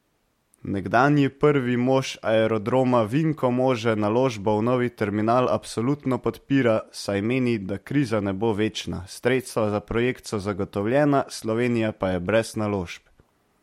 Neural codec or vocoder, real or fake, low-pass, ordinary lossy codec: none; real; 19.8 kHz; MP3, 64 kbps